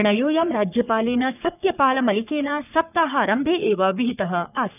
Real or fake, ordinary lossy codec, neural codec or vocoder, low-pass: fake; none; codec, 44.1 kHz, 3.4 kbps, Pupu-Codec; 3.6 kHz